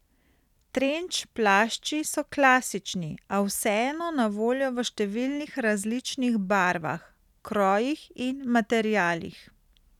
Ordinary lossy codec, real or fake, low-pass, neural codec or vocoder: none; real; 19.8 kHz; none